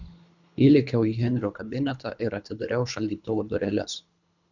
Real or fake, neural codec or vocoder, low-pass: fake; codec, 24 kHz, 3 kbps, HILCodec; 7.2 kHz